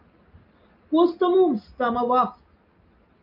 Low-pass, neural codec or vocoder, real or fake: 5.4 kHz; none; real